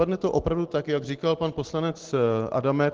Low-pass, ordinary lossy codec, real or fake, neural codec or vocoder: 7.2 kHz; Opus, 16 kbps; real; none